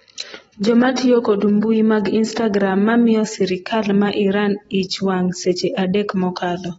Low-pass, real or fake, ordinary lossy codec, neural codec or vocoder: 19.8 kHz; real; AAC, 24 kbps; none